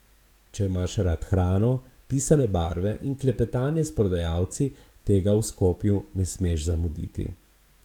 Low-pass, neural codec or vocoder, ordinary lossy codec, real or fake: 19.8 kHz; codec, 44.1 kHz, 7.8 kbps, DAC; none; fake